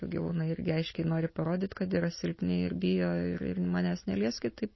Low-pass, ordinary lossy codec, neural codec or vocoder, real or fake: 7.2 kHz; MP3, 24 kbps; none; real